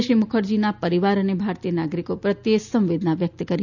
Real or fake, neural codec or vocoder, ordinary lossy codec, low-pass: real; none; none; 7.2 kHz